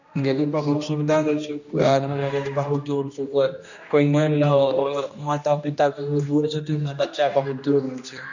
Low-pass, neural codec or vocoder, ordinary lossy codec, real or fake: 7.2 kHz; codec, 16 kHz, 1 kbps, X-Codec, HuBERT features, trained on general audio; none; fake